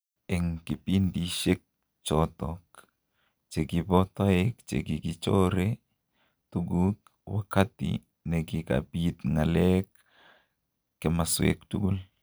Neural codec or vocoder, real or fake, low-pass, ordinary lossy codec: none; real; none; none